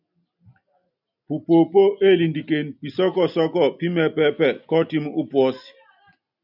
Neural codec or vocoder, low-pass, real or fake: none; 5.4 kHz; real